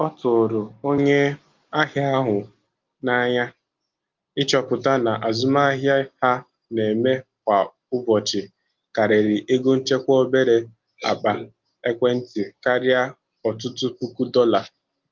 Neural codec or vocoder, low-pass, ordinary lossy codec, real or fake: none; 7.2 kHz; Opus, 24 kbps; real